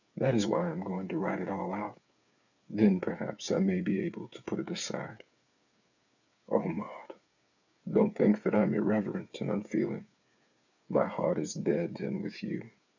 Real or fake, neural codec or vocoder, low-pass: fake; codec, 16 kHz, 8 kbps, FreqCodec, smaller model; 7.2 kHz